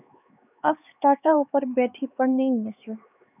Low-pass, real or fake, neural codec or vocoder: 3.6 kHz; fake; codec, 16 kHz, 4 kbps, X-Codec, HuBERT features, trained on LibriSpeech